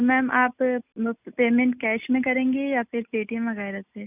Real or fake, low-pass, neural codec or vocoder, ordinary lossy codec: real; 3.6 kHz; none; none